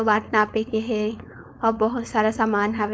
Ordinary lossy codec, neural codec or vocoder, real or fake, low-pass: none; codec, 16 kHz, 4.8 kbps, FACodec; fake; none